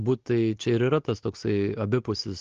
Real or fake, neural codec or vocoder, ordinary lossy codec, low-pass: real; none; Opus, 16 kbps; 7.2 kHz